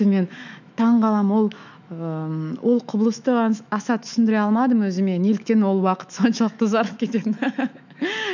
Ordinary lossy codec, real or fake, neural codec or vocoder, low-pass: none; fake; autoencoder, 48 kHz, 128 numbers a frame, DAC-VAE, trained on Japanese speech; 7.2 kHz